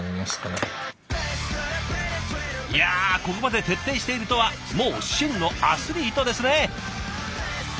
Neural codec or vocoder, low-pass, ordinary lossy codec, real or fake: none; none; none; real